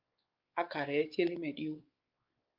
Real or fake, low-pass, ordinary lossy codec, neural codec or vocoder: fake; 5.4 kHz; Opus, 24 kbps; codec, 16 kHz, 4 kbps, X-Codec, WavLM features, trained on Multilingual LibriSpeech